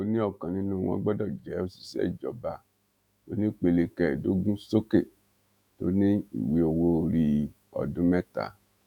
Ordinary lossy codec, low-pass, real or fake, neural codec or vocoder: none; 19.8 kHz; fake; autoencoder, 48 kHz, 128 numbers a frame, DAC-VAE, trained on Japanese speech